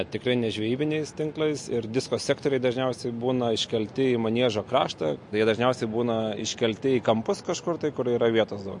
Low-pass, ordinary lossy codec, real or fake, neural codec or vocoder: 10.8 kHz; MP3, 48 kbps; real; none